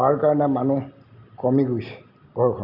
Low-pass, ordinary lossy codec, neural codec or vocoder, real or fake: 5.4 kHz; none; none; real